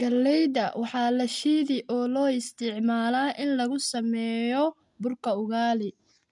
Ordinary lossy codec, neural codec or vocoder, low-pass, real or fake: MP3, 96 kbps; none; 10.8 kHz; real